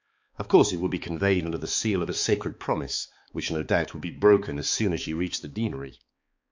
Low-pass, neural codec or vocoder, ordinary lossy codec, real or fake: 7.2 kHz; codec, 16 kHz, 4 kbps, X-Codec, HuBERT features, trained on balanced general audio; MP3, 48 kbps; fake